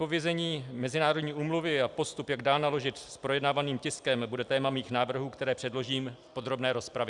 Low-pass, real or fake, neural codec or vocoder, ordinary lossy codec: 10.8 kHz; real; none; Opus, 64 kbps